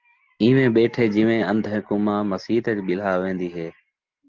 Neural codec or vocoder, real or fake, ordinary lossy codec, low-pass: none; real; Opus, 16 kbps; 7.2 kHz